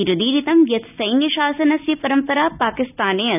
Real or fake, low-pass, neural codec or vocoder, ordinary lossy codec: real; 3.6 kHz; none; none